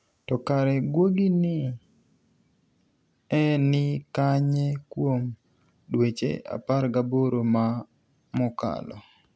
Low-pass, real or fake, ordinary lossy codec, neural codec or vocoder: none; real; none; none